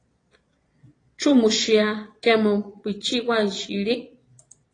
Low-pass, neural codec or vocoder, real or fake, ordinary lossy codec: 9.9 kHz; vocoder, 22.05 kHz, 80 mel bands, Vocos; fake; AAC, 32 kbps